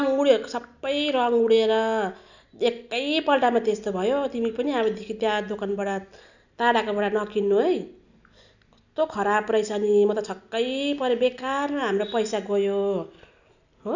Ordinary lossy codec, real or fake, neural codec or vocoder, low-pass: none; real; none; 7.2 kHz